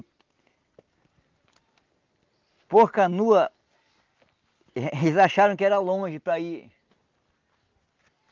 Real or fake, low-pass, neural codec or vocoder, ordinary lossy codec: real; 7.2 kHz; none; Opus, 24 kbps